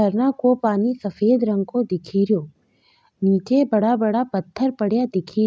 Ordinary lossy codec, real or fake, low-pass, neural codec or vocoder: none; real; none; none